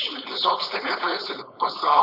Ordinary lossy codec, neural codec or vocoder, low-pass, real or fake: Opus, 64 kbps; codec, 16 kHz, 4.8 kbps, FACodec; 5.4 kHz; fake